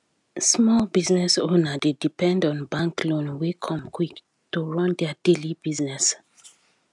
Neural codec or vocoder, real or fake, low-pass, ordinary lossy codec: none; real; 10.8 kHz; none